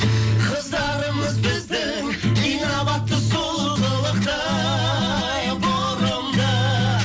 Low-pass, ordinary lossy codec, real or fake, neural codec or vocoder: none; none; real; none